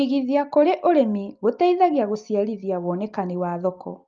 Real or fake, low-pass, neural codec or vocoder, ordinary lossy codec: real; 7.2 kHz; none; Opus, 32 kbps